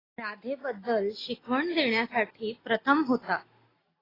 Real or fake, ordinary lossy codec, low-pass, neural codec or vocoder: real; AAC, 24 kbps; 5.4 kHz; none